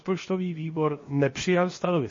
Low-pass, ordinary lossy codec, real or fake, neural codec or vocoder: 7.2 kHz; MP3, 32 kbps; fake; codec, 16 kHz, 0.7 kbps, FocalCodec